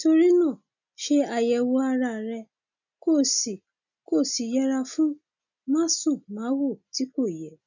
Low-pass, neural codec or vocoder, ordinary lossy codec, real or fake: 7.2 kHz; none; none; real